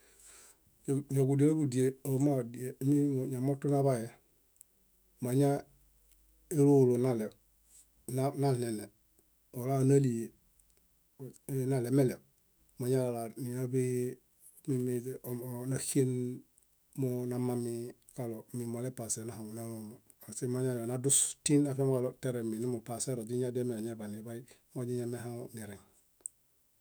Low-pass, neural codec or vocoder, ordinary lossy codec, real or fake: none; autoencoder, 48 kHz, 128 numbers a frame, DAC-VAE, trained on Japanese speech; none; fake